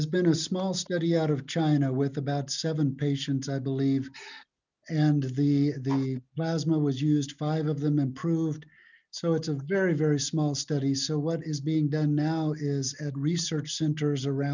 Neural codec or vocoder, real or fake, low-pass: none; real; 7.2 kHz